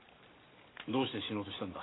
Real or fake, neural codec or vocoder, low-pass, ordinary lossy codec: real; none; 7.2 kHz; AAC, 16 kbps